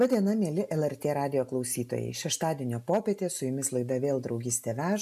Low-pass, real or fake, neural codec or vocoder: 14.4 kHz; real; none